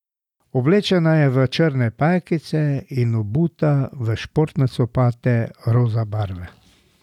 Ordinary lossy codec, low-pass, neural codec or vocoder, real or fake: none; 19.8 kHz; none; real